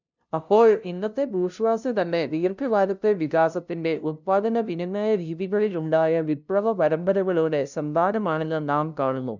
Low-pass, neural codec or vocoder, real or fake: 7.2 kHz; codec, 16 kHz, 0.5 kbps, FunCodec, trained on LibriTTS, 25 frames a second; fake